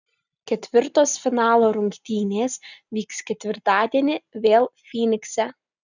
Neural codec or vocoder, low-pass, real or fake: none; 7.2 kHz; real